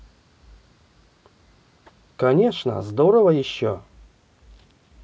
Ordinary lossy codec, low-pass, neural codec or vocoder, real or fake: none; none; none; real